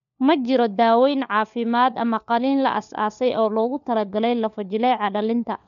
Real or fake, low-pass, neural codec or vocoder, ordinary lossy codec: fake; 7.2 kHz; codec, 16 kHz, 4 kbps, FunCodec, trained on LibriTTS, 50 frames a second; none